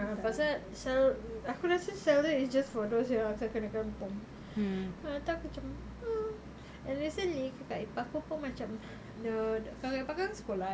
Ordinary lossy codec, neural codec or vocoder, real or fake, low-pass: none; none; real; none